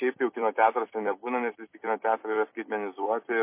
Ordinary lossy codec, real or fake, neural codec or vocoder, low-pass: MP3, 24 kbps; fake; vocoder, 44.1 kHz, 128 mel bands every 256 samples, BigVGAN v2; 3.6 kHz